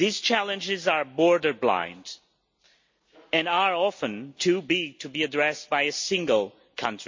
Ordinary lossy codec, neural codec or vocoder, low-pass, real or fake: MP3, 48 kbps; none; 7.2 kHz; real